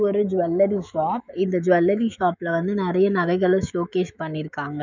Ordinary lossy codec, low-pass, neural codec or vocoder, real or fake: none; 7.2 kHz; autoencoder, 48 kHz, 128 numbers a frame, DAC-VAE, trained on Japanese speech; fake